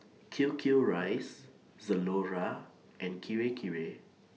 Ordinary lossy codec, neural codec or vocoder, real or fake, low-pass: none; none; real; none